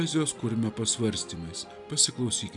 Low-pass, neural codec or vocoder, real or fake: 10.8 kHz; none; real